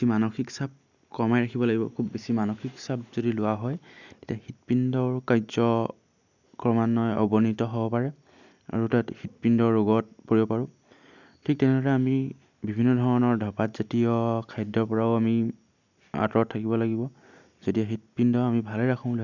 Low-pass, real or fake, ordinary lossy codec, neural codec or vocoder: 7.2 kHz; real; none; none